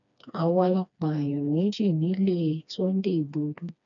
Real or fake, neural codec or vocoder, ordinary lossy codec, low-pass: fake; codec, 16 kHz, 2 kbps, FreqCodec, smaller model; none; 7.2 kHz